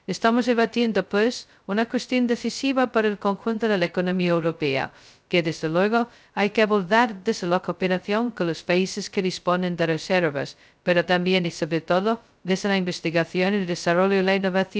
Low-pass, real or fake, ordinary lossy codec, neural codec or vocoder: none; fake; none; codec, 16 kHz, 0.2 kbps, FocalCodec